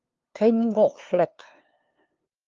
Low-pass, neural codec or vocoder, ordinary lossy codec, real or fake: 7.2 kHz; codec, 16 kHz, 2 kbps, FunCodec, trained on LibriTTS, 25 frames a second; Opus, 32 kbps; fake